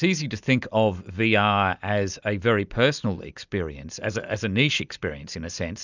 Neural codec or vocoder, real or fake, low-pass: none; real; 7.2 kHz